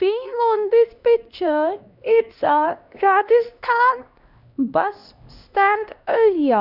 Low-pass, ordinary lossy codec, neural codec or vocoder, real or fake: 5.4 kHz; none; codec, 16 kHz, 1 kbps, X-Codec, HuBERT features, trained on LibriSpeech; fake